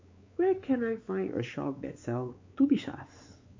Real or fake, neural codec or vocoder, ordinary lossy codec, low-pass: fake; codec, 16 kHz, 4 kbps, X-Codec, WavLM features, trained on Multilingual LibriSpeech; MP3, 48 kbps; 7.2 kHz